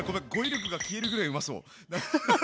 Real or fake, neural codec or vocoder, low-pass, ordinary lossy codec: real; none; none; none